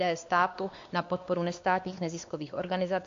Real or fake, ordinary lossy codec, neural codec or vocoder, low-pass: fake; AAC, 48 kbps; codec, 16 kHz, 2 kbps, X-Codec, WavLM features, trained on Multilingual LibriSpeech; 7.2 kHz